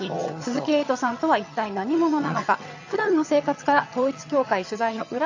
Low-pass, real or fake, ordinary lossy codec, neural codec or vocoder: 7.2 kHz; fake; AAC, 48 kbps; vocoder, 22.05 kHz, 80 mel bands, HiFi-GAN